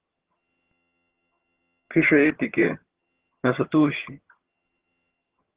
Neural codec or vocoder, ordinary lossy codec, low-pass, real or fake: vocoder, 22.05 kHz, 80 mel bands, HiFi-GAN; Opus, 16 kbps; 3.6 kHz; fake